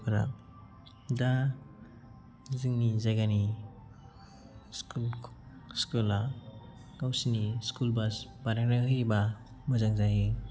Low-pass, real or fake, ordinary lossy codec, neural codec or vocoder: none; real; none; none